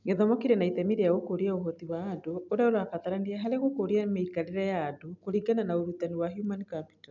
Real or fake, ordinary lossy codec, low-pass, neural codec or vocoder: real; none; 7.2 kHz; none